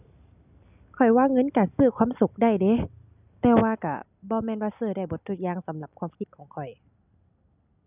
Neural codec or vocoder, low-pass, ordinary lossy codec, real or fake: none; 3.6 kHz; none; real